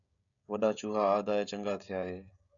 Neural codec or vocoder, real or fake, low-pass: codec, 16 kHz, 16 kbps, FreqCodec, smaller model; fake; 7.2 kHz